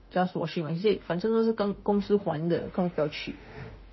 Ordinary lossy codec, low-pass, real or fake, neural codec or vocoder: MP3, 24 kbps; 7.2 kHz; fake; autoencoder, 48 kHz, 32 numbers a frame, DAC-VAE, trained on Japanese speech